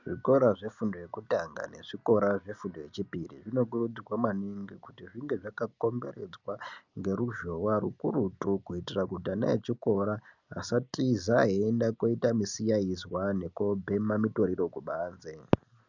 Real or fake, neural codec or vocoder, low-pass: real; none; 7.2 kHz